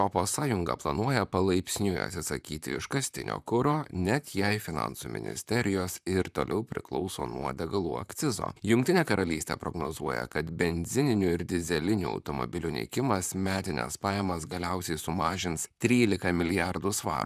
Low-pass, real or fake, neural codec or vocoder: 14.4 kHz; fake; vocoder, 44.1 kHz, 128 mel bands, Pupu-Vocoder